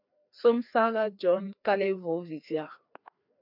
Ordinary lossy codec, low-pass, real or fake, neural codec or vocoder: MP3, 48 kbps; 5.4 kHz; fake; codec, 16 kHz, 2 kbps, FreqCodec, larger model